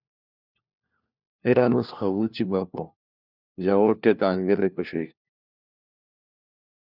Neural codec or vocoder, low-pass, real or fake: codec, 16 kHz, 1 kbps, FunCodec, trained on LibriTTS, 50 frames a second; 5.4 kHz; fake